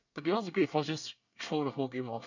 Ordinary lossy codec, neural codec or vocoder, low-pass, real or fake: none; codec, 24 kHz, 1 kbps, SNAC; 7.2 kHz; fake